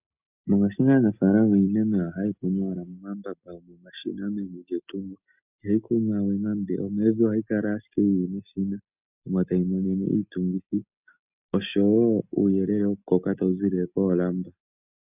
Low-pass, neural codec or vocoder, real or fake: 3.6 kHz; none; real